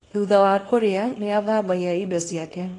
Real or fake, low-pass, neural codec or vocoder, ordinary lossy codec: fake; 10.8 kHz; codec, 24 kHz, 0.9 kbps, WavTokenizer, small release; AAC, 32 kbps